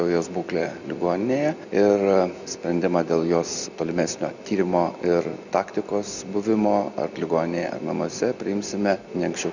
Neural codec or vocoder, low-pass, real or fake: none; 7.2 kHz; real